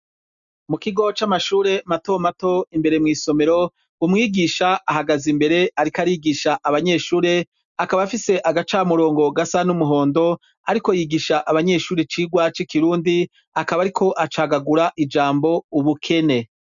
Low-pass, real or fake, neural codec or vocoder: 7.2 kHz; real; none